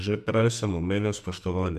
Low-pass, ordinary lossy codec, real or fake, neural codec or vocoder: 14.4 kHz; none; fake; codec, 44.1 kHz, 2.6 kbps, SNAC